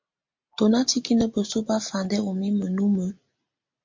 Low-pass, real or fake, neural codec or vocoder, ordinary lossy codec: 7.2 kHz; real; none; MP3, 48 kbps